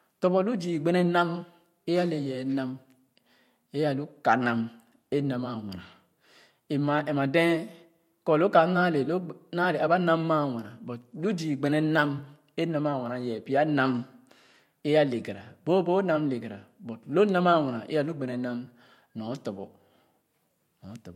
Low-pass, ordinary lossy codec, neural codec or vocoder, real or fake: 19.8 kHz; MP3, 64 kbps; vocoder, 44.1 kHz, 128 mel bands every 512 samples, BigVGAN v2; fake